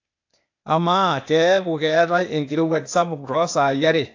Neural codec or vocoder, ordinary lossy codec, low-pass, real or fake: codec, 16 kHz, 0.8 kbps, ZipCodec; AAC, 48 kbps; 7.2 kHz; fake